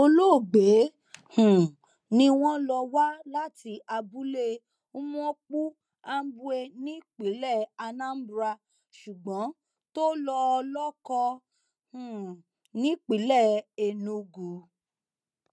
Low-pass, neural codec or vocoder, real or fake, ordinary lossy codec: none; none; real; none